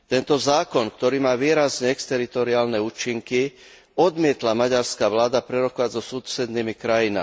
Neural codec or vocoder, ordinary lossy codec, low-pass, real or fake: none; none; none; real